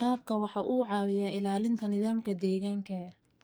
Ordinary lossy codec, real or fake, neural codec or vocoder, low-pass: none; fake; codec, 44.1 kHz, 2.6 kbps, SNAC; none